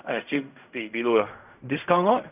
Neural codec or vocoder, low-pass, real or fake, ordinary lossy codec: codec, 16 kHz in and 24 kHz out, 0.4 kbps, LongCat-Audio-Codec, fine tuned four codebook decoder; 3.6 kHz; fake; none